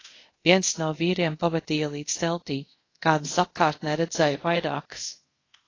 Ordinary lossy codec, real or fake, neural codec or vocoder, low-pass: AAC, 32 kbps; fake; codec, 24 kHz, 0.5 kbps, DualCodec; 7.2 kHz